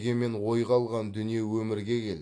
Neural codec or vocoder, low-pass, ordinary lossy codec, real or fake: none; 9.9 kHz; AAC, 48 kbps; real